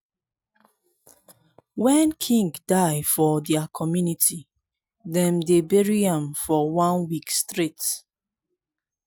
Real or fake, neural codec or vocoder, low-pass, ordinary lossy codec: real; none; none; none